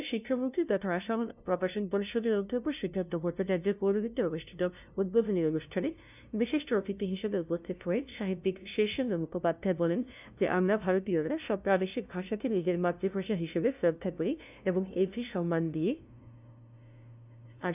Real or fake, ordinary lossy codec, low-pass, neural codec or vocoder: fake; none; 3.6 kHz; codec, 16 kHz, 0.5 kbps, FunCodec, trained on LibriTTS, 25 frames a second